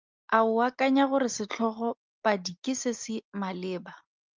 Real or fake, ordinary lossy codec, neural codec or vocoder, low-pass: real; Opus, 24 kbps; none; 7.2 kHz